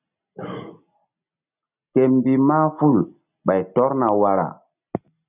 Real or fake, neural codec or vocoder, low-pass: real; none; 3.6 kHz